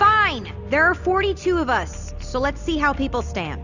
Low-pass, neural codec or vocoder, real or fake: 7.2 kHz; none; real